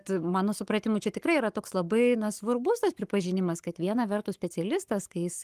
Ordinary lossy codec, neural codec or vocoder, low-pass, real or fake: Opus, 24 kbps; codec, 44.1 kHz, 7.8 kbps, Pupu-Codec; 14.4 kHz; fake